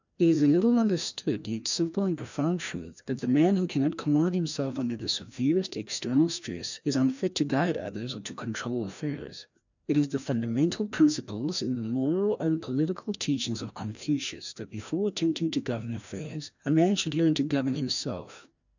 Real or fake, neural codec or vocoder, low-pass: fake; codec, 16 kHz, 1 kbps, FreqCodec, larger model; 7.2 kHz